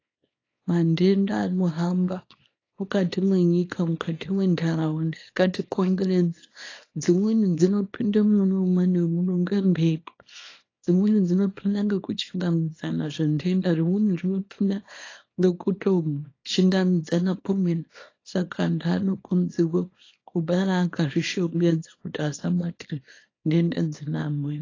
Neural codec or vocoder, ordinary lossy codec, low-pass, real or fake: codec, 24 kHz, 0.9 kbps, WavTokenizer, small release; AAC, 32 kbps; 7.2 kHz; fake